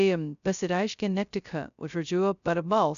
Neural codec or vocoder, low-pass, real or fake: codec, 16 kHz, 0.2 kbps, FocalCodec; 7.2 kHz; fake